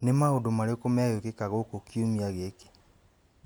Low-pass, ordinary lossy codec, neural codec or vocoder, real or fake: none; none; none; real